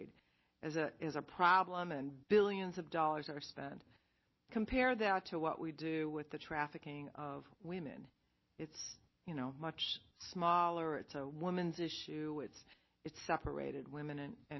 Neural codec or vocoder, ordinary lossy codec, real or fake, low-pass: none; MP3, 24 kbps; real; 7.2 kHz